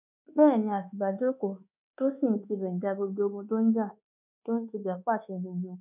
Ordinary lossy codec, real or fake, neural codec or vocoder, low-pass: none; fake; codec, 24 kHz, 1.2 kbps, DualCodec; 3.6 kHz